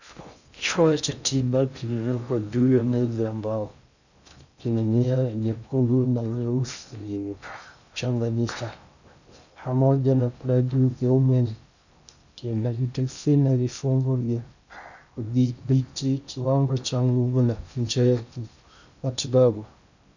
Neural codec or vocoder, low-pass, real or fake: codec, 16 kHz in and 24 kHz out, 0.6 kbps, FocalCodec, streaming, 2048 codes; 7.2 kHz; fake